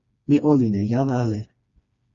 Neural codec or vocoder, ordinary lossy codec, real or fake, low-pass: codec, 16 kHz, 2 kbps, FreqCodec, smaller model; Opus, 64 kbps; fake; 7.2 kHz